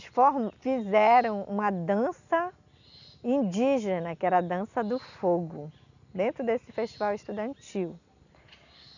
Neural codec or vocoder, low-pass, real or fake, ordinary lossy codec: none; 7.2 kHz; real; none